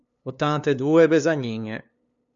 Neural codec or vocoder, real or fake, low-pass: codec, 16 kHz, 2 kbps, FunCodec, trained on LibriTTS, 25 frames a second; fake; 7.2 kHz